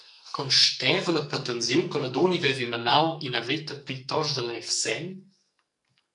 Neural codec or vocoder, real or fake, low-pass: codec, 44.1 kHz, 2.6 kbps, SNAC; fake; 10.8 kHz